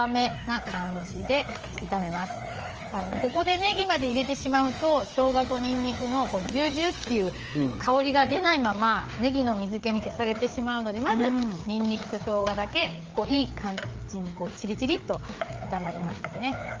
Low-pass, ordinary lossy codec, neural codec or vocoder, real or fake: 7.2 kHz; Opus, 24 kbps; codec, 16 kHz, 4 kbps, FreqCodec, larger model; fake